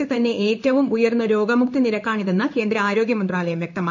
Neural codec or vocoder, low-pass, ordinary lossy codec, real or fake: codec, 16 kHz in and 24 kHz out, 1 kbps, XY-Tokenizer; 7.2 kHz; none; fake